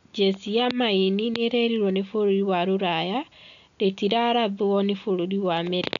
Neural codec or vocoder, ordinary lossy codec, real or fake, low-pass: none; none; real; 7.2 kHz